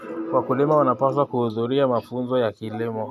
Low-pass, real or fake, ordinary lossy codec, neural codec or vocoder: 14.4 kHz; real; AAC, 96 kbps; none